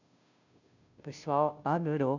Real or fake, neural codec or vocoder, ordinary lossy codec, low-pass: fake; codec, 16 kHz, 0.5 kbps, FunCodec, trained on Chinese and English, 25 frames a second; none; 7.2 kHz